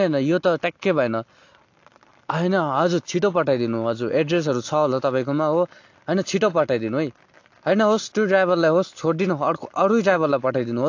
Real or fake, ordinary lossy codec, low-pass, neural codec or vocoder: real; AAC, 48 kbps; 7.2 kHz; none